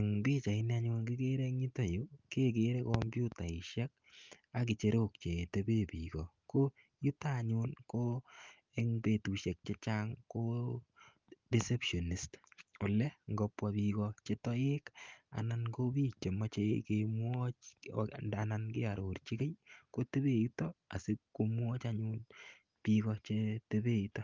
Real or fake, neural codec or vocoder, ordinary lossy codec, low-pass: real; none; Opus, 32 kbps; 7.2 kHz